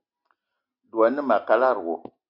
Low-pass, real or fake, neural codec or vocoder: 5.4 kHz; real; none